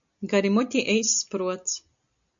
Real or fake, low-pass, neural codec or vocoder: real; 7.2 kHz; none